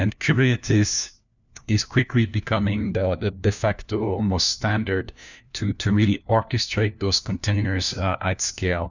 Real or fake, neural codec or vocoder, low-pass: fake; codec, 16 kHz, 1 kbps, FunCodec, trained on LibriTTS, 50 frames a second; 7.2 kHz